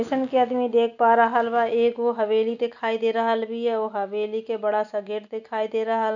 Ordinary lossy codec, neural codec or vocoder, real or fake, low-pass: none; none; real; 7.2 kHz